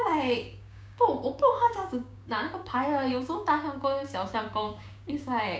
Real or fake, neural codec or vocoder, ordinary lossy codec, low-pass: fake; codec, 16 kHz, 6 kbps, DAC; none; none